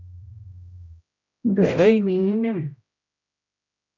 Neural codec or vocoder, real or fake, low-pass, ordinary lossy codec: codec, 16 kHz, 0.5 kbps, X-Codec, HuBERT features, trained on general audio; fake; 7.2 kHz; AAC, 48 kbps